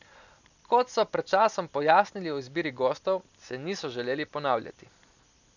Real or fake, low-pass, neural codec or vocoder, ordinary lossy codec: real; 7.2 kHz; none; none